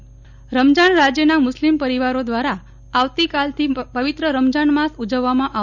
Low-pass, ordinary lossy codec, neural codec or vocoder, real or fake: 7.2 kHz; none; none; real